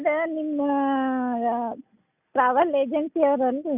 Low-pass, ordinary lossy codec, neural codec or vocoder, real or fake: 3.6 kHz; none; none; real